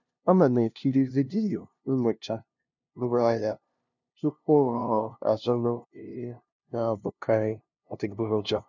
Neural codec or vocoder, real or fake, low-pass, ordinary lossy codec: codec, 16 kHz, 0.5 kbps, FunCodec, trained on LibriTTS, 25 frames a second; fake; 7.2 kHz; none